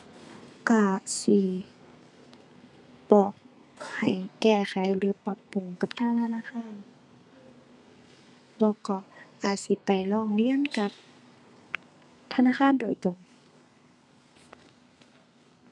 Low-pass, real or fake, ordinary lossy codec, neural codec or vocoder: 10.8 kHz; fake; none; codec, 32 kHz, 1.9 kbps, SNAC